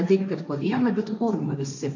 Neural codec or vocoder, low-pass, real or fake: codec, 16 kHz, 1.1 kbps, Voila-Tokenizer; 7.2 kHz; fake